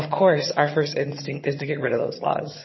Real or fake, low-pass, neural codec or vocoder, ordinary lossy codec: fake; 7.2 kHz; vocoder, 22.05 kHz, 80 mel bands, HiFi-GAN; MP3, 24 kbps